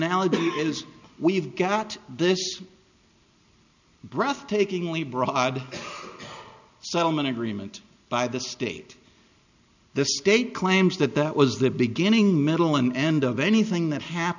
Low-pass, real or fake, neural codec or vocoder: 7.2 kHz; real; none